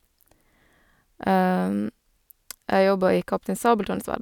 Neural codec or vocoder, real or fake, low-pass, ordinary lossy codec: none; real; 19.8 kHz; none